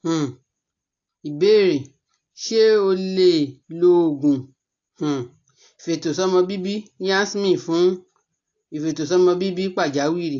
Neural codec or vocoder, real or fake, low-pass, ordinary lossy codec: none; real; 7.2 kHz; AAC, 48 kbps